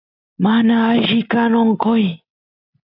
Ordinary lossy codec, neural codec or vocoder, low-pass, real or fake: AAC, 32 kbps; none; 5.4 kHz; real